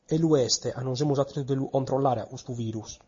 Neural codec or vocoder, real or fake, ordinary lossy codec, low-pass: none; real; MP3, 32 kbps; 7.2 kHz